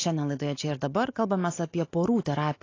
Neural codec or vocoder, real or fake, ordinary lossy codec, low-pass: none; real; AAC, 32 kbps; 7.2 kHz